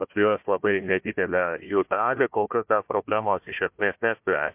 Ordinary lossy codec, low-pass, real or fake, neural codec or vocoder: MP3, 32 kbps; 3.6 kHz; fake; codec, 16 kHz, 1 kbps, FunCodec, trained on Chinese and English, 50 frames a second